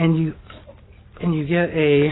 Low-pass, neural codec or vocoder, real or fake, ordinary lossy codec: 7.2 kHz; none; real; AAC, 16 kbps